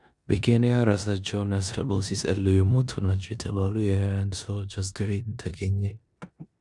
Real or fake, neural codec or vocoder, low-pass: fake; codec, 16 kHz in and 24 kHz out, 0.9 kbps, LongCat-Audio-Codec, four codebook decoder; 10.8 kHz